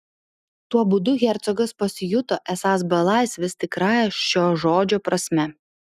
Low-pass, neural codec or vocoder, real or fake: 14.4 kHz; none; real